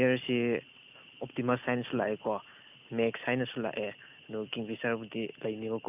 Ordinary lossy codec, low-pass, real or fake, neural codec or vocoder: none; 3.6 kHz; real; none